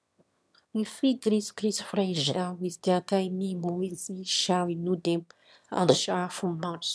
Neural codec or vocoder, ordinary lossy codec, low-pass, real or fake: autoencoder, 22.05 kHz, a latent of 192 numbers a frame, VITS, trained on one speaker; none; none; fake